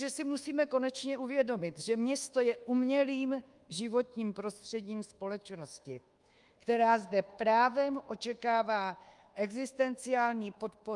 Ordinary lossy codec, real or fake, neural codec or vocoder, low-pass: Opus, 32 kbps; fake; codec, 24 kHz, 1.2 kbps, DualCodec; 10.8 kHz